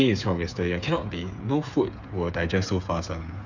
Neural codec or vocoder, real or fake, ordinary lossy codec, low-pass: codec, 16 kHz, 8 kbps, FreqCodec, smaller model; fake; none; 7.2 kHz